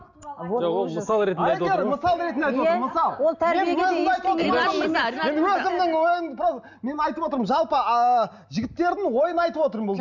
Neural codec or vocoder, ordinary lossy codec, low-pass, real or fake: none; none; 7.2 kHz; real